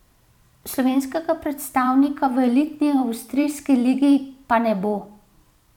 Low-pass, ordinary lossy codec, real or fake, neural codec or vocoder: 19.8 kHz; none; fake; vocoder, 44.1 kHz, 128 mel bands every 512 samples, BigVGAN v2